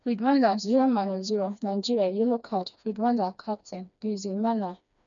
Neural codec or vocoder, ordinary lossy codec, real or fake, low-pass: codec, 16 kHz, 2 kbps, FreqCodec, smaller model; none; fake; 7.2 kHz